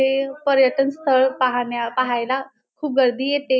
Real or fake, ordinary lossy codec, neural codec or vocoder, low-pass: real; none; none; none